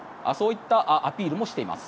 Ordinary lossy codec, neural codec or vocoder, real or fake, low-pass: none; none; real; none